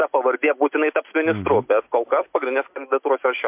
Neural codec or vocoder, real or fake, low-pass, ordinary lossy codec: none; real; 3.6 kHz; MP3, 32 kbps